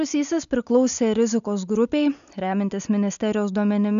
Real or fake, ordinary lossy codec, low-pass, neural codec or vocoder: real; MP3, 96 kbps; 7.2 kHz; none